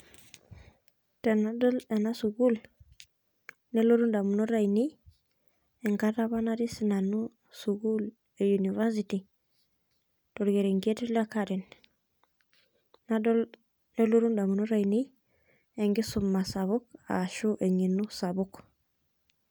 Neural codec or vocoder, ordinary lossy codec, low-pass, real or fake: none; none; none; real